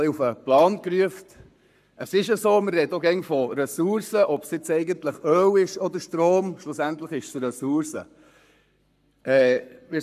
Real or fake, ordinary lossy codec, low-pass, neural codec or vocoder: fake; none; 14.4 kHz; codec, 44.1 kHz, 7.8 kbps, Pupu-Codec